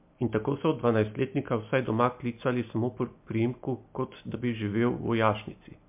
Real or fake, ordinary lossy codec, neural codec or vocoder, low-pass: real; MP3, 32 kbps; none; 3.6 kHz